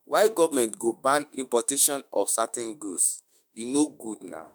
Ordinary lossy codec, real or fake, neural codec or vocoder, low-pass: none; fake; autoencoder, 48 kHz, 32 numbers a frame, DAC-VAE, trained on Japanese speech; none